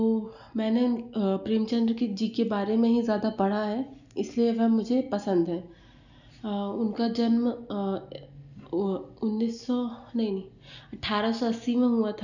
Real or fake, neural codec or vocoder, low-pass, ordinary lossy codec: real; none; 7.2 kHz; none